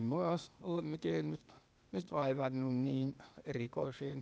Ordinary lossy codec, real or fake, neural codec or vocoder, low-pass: none; fake; codec, 16 kHz, 0.8 kbps, ZipCodec; none